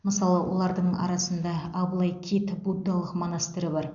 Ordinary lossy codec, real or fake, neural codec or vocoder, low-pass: none; real; none; 7.2 kHz